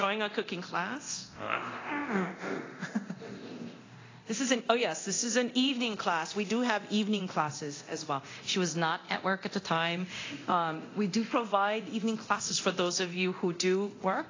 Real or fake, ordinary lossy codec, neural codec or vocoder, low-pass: fake; AAC, 32 kbps; codec, 24 kHz, 0.9 kbps, DualCodec; 7.2 kHz